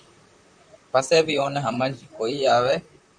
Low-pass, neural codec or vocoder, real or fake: 9.9 kHz; vocoder, 44.1 kHz, 128 mel bands, Pupu-Vocoder; fake